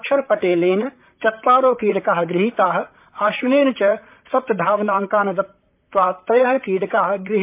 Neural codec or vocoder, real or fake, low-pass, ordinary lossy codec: vocoder, 44.1 kHz, 128 mel bands, Pupu-Vocoder; fake; 3.6 kHz; MP3, 32 kbps